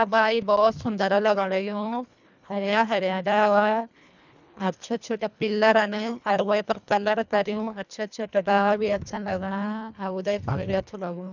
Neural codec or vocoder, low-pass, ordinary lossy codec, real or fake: codec, 24 kHz, 1.5 kbps, HILCodec; 7.2 kHz; none; fake